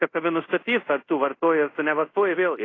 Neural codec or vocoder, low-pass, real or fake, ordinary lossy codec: codec, 24 kHz, 0.5 kbps, DualCodec; 7.2 kHz; fake; AAC, 32 kbps